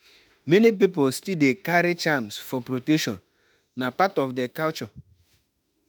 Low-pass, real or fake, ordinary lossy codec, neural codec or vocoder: none; fake; none; autoencoder, 48 kHz, 32 numbers a frame, DAC-VAE, trained on Japanese speech